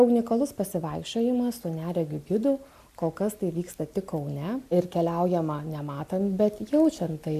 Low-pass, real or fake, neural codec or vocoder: 14.4 kHz; real; none